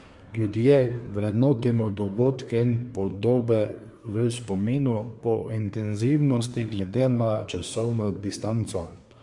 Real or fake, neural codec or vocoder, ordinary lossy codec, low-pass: fake; codec, 24 kHz, 1 kbps, SNAC; MP3, 64 kbps; 10.8 kHz